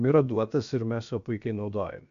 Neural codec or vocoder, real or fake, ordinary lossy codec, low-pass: codec, 16 kHz, about 1 kbps, DyCAST, with the encoder's durations; fake; MP3, 96 kbps; 7.2 kHz